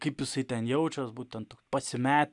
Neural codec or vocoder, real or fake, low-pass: none; real; 10.8 kHz